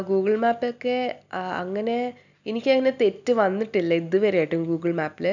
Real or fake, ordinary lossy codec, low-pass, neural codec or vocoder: real; none; 7.2 kHz; none